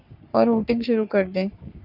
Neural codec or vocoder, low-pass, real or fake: codec, 44.1 kHz, 3.4 kbps, Pupu-Codec; 5.4 kHz; fake